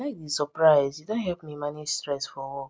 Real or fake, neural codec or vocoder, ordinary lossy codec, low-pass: real; none; none; none